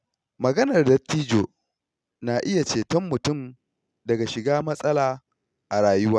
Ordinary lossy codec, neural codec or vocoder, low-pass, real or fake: none; none; none; real